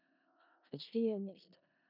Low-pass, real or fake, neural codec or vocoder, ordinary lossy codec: 5.4 kHz; fake; codec, 16 kHz in and 24 kHz out, 0.4 kbps, LongCat-Audio-Codec, four codebook decoder; none